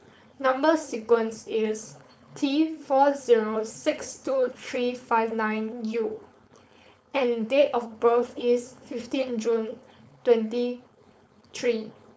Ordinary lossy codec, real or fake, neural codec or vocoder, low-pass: none; fake; codec, 16 kHz, 4.8 kbps, FACodec; none